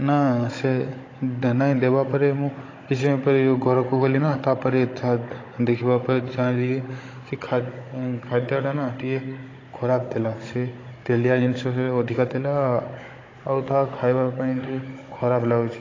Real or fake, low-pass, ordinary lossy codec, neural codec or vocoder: fake; 7.2 kHz; AAC, 32 kbps; codec, 16 kHz, 16 kbps, FunCodec, trained on Chinese and English, 50 frames a second